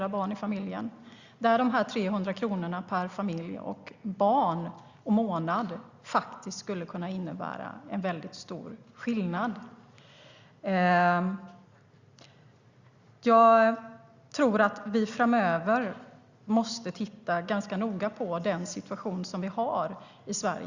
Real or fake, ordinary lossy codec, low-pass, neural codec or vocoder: real; Opus, 64 kbps; 7.2 kHz; none